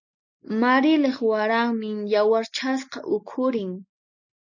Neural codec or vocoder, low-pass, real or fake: none; 7.2 kHz; real